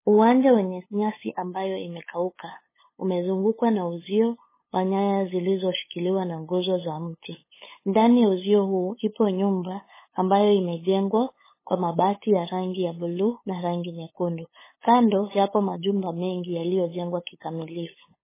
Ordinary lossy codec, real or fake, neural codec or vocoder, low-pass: MP3, 16 kbps; fake; codec, 16 kHz, 8 kbps, FunCodec, trained on LibriTTS, 25 frames a second; 3.6 kHz